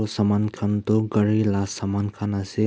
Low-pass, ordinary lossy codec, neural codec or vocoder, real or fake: none; none; none; real